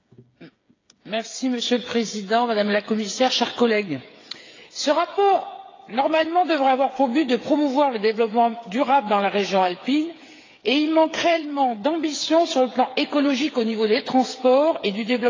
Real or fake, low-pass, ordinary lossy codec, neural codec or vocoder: fake; 7.2 kHz; AAC, 32 kbps; codec, 16 kHz, 8 kbps, FreqCodec, smaller model